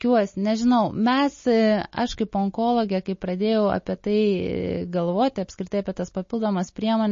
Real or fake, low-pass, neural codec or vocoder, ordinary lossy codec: real; 7.2 kHz; none; MP3, 32 kbps